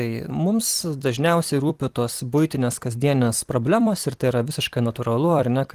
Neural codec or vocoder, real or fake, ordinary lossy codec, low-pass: vocoder, 44.1 kHz, 128 mel bands every 256 samples, BigVGAN v2; fake; Opus, 24 kbps; 14.4 kHz